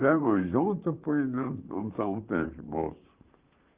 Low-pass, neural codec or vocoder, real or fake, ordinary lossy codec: 3.6 kHz; vocoder, 44.1 kHz, 128 mel bands, Pupu-Vocoder; fake; Opus, 32 kbps